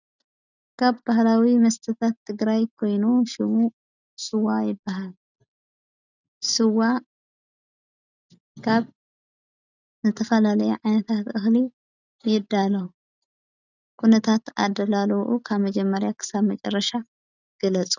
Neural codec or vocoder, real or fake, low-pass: none; real; 7.2 kHz